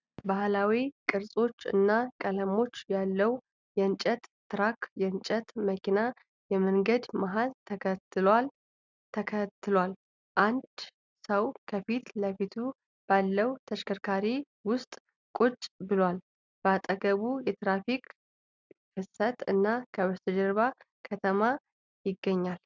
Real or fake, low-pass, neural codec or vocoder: real; 7.2 kHz; none